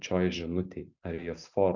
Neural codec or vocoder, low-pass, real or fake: none; 7.2 kHz; real